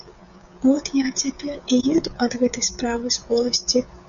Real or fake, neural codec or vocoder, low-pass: fake; codec, 16 kHz, 16 kbps, FreqCodec, smaller model; 7.2 kHz